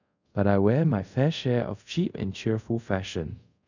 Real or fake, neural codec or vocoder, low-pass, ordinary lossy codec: fake; codec, 24 kHz, 0.5 kbps, DualCodec; 7.2 kHz; none